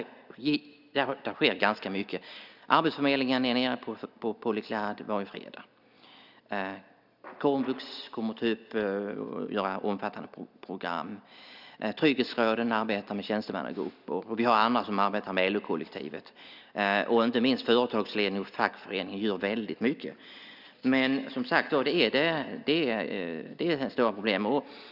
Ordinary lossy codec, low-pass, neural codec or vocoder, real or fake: none; 5.4 kHz; none; real